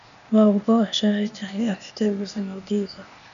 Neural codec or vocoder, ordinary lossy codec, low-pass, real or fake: codec, 16 kHz, 0.8 kbps, ZipCodec; MP3, 96 kbps; 7.2 kHz; fake